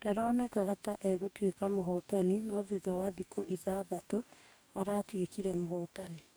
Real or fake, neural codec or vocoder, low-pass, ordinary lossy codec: fake; codec, 44.1 kHz, 2.6 kbps, DAC; none; none